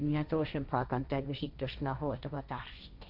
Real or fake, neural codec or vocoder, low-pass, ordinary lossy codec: fake; codec, 16 kHz, 1.1 kbps, Voila-Tokenizer; 5.4 kHz; none